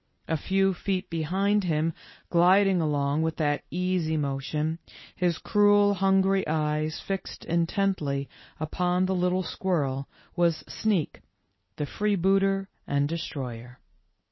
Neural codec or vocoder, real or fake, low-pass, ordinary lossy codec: none; real; 7.2 kHz; MP3, 24 kbps